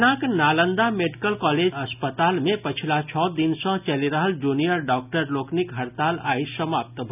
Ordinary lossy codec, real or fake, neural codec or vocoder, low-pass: none; real; none; 3.6 kHz